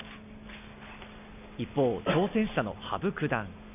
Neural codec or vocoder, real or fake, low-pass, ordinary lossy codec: none; real; 3.6 kHz; none